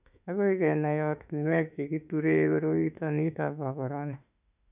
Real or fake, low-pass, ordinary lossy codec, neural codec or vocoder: fake; 3.6 kHz; none; autoencoder, 48 kHz, 32 numbers a frame, DAC-VAE, trained on Japanese speech